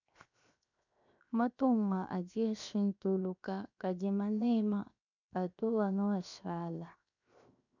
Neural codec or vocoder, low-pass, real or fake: codec, 16 kHz, 0.7 kbps, FocalCodec; 7.2 kHz; fake